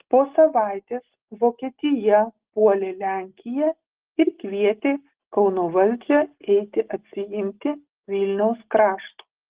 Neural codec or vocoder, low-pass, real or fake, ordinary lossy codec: none; 3.6 kHz; real; Opus, 16 kbps